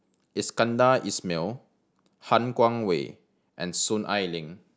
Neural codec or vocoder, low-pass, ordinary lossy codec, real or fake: none; none; none; real